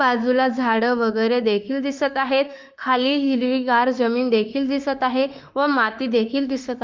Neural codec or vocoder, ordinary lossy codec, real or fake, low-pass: autoencoder, 48 kHz, 32 numbers a frame, DAC-VAE, trained on Japanese speech; Opus, 24 kbps; fake; 7.2 kHz